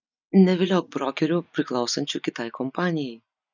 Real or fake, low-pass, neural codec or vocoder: real; 7.2 kHz; none